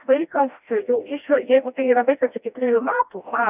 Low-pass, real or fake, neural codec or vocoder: 3.6 kHz; fake; codec, 16 kHz, 1 kbps, FreqCodec, smaller model